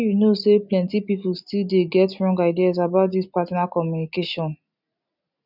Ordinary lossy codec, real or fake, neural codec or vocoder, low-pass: none; real; none; 5.4 kHz